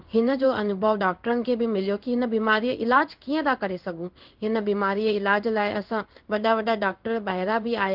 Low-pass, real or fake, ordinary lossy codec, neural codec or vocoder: 5.4 kHz; fake; Opus, 32 kbps; codec, 16 kHz in and 24 kHz out, 1 kbps, XY-Tokenizer